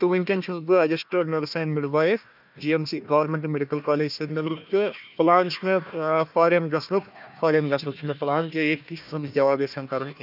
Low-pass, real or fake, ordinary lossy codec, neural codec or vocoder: 5.4 kHz; fake; none; codec, 16 kHz, 1 kbps, FunCodec, trained on Chinese and English, 50 frames a second